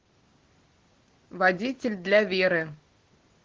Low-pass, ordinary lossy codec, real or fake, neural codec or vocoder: 7.2 kHz; Opus, 16 kbps; real; none